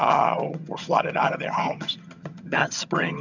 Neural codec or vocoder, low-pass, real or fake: vocoder, 22.05 kHz, 80 mel bands, HiFi-GAN; 7.2 kHz; fake